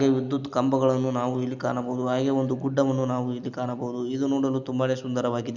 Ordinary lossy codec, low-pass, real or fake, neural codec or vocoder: Opus, 64 kbps; 7.2 kHz; real; none